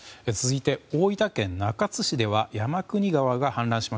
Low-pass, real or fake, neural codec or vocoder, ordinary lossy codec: none; real; none; none